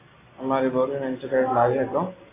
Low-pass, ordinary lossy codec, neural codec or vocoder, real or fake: 3.6 kHz; AAC, 16 kbps; none; real